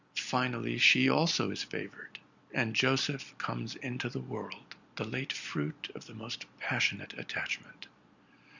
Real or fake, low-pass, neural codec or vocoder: real; 7.2 kHz; none